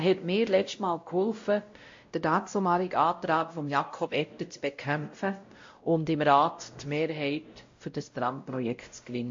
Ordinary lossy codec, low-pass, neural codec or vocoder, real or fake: MP3, 48 kbps; 7.2 kHz; codec, 16 kHz, 0.5 kbps, X-Codec, WavLM features, trained on Multilingual LibriSpeech; fake